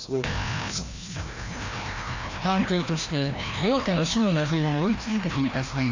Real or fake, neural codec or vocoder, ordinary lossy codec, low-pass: fake; codec, 16 kHz, 1 kbps, FreqCodec, larger model; AAC, 48 kbps; 7.2 kHz